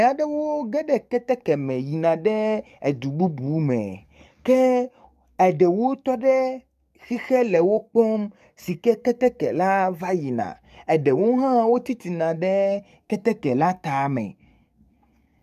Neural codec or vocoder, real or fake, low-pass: codec, 44.1 kHz, 7.8 kbps, DAC; fake; 14.4 kHz